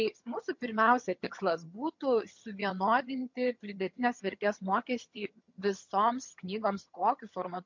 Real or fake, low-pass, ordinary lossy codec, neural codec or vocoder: fake; 7.2 kHz; MP3, 48 kbps; vocoder, 22.05 kHz, 80 mel bands, HiFi-GAN